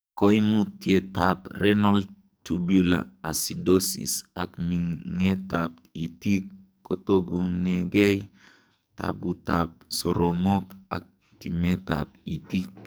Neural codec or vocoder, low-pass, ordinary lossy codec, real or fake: codec, 44.1 kHz, 2.6 kbps, SNAC; none; none; fake